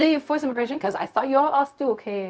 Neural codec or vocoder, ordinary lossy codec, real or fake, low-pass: codec, 16 kHz, 0.4 kbps, LongCat-Audio-Codec; none; fake; none